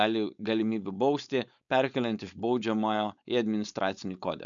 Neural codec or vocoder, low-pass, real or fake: codec, 16 kHz, 4.8 kbps, FACodec; 7.2 kHz; fake